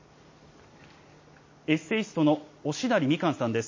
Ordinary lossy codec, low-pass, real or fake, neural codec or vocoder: MP3, 32 kbps; 7.2 kHz; real; none